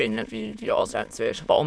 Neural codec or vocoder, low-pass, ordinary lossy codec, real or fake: autoencoder, 22.05 kHz, a latent of 192 numbers a frame, VITS, trained on many speakers; none; none; fake